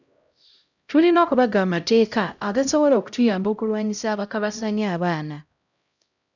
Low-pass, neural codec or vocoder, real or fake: 7.2 kHz; codec, 16 kHz, 0.5 kbps, X-Codec, HuBERT features, trained on LibriSpeech; fake